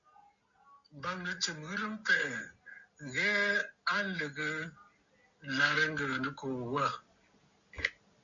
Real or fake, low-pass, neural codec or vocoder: real; 7.2 kHz; none